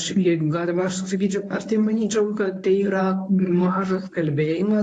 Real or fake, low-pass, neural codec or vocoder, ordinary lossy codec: fake; 10.8 kHz; codec, 24 kHz, 0.9 kbps, WavTokenizer, medium speech release version 1; AAC, 48 kbps